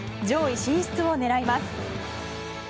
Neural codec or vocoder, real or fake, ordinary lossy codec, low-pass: none; real; none; none